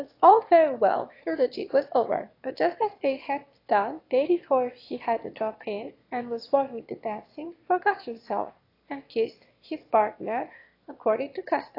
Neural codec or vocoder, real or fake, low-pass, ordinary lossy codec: autoencoder, 22.05 kHz, a latent of 192 numbers a frame, VITS, trained on one speaker; fake; 5.4 kHz; AAC, 32 kbps